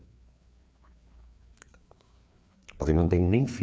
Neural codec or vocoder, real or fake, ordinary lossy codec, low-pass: codec, 16 kHz, 4 kbps, FreqCodec, larger model; fake; none; none